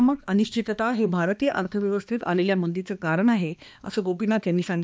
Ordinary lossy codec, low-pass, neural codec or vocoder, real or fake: none; none; codec, 16 kHz, 2 kbps, X-Codec, HuBERT features, trained on balanced general audio; fake